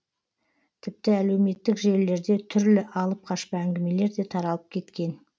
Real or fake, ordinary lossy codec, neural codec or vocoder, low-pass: real; none; none; none